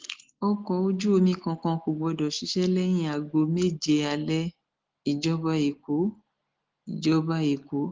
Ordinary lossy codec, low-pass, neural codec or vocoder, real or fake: Opus, 16 kbps; 7.2 kHz; none; real